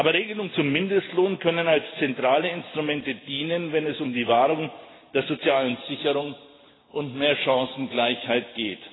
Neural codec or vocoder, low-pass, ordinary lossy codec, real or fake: none; 7.2 kHz; AAC, 16 kbps; real